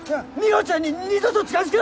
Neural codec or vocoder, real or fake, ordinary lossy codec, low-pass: none; real; none; none